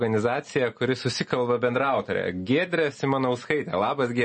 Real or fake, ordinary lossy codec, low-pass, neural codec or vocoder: real; MP3, 32 kbps; 10.8 kHz; none